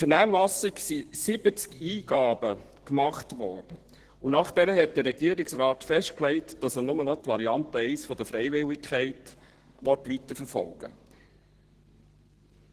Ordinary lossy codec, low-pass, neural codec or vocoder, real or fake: Opus, 16 kbps; 14.4 kHz; codec, 32 kHz, 1.9 kbps, SNAC; fake